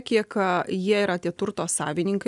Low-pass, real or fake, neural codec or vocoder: 10.8 kHz; real; none